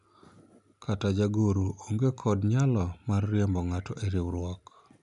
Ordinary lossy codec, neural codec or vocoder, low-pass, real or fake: none; none; 10.8 kHz; real